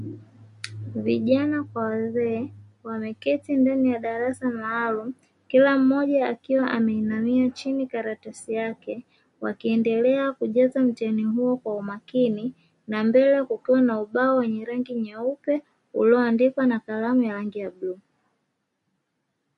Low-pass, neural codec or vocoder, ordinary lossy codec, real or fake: 14.4 kHz; none; MP3, 48 kbps; real